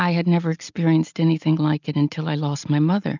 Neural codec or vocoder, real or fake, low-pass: none; real; 7.2 kHz